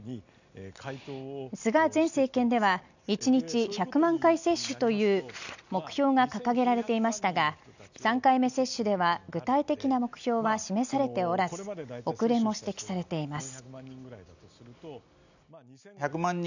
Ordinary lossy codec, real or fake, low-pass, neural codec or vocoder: none; real; 7.2 kHz; none